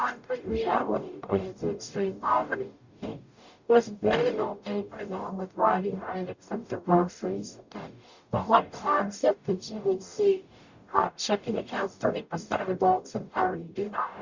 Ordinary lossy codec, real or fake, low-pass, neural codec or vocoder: Opus, 64 kbps; fake; 7.2 kHz; codec, 44.1 kHz, 0.9 kbps, DAC